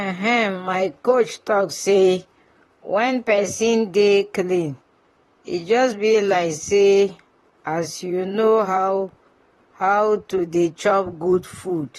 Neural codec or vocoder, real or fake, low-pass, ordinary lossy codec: vocoder, 44.1 kHz, 128 mel bands, Pupu-Vocoder; fake; 19.8 kHz; AAC, 32 kbps